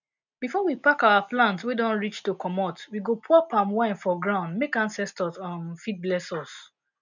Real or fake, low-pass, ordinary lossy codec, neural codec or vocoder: real; 7.2 kHz; none; none